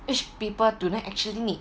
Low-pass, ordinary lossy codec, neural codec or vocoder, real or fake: none; none; none; real